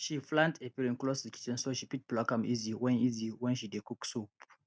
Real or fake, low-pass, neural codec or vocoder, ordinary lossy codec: real; none; none; none